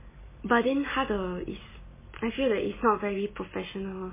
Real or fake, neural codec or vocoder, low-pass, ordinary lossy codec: real; none; 3.6 kHz; MP3, 16 kbps